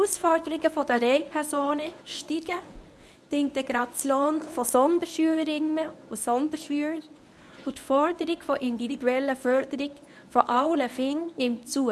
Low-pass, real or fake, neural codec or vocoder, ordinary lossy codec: none; fake; codec, 24 kHz, 0.9 kbps, WavTokenizer, medium speech release version 1; none